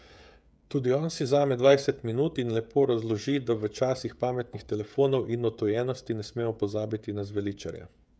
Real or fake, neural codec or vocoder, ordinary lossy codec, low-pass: fake; codec, 16 kHz, 16 kbps, FreqCodec, smaller model; none; none